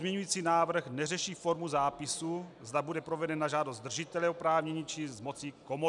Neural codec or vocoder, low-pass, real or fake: none; 10.8 kHz; real